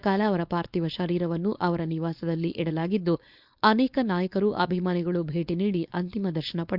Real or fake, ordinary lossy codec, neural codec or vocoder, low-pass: fake; Opus, 64 kbps; codec, 16 kHz, 4.8 kbps, FACodec; 5.4 kHz